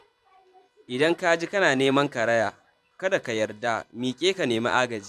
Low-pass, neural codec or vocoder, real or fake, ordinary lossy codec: 14.4 kHz; vocoder, 44.1 kHz, 128 mel bands every 512 samples, BigVGAN v2; fake; none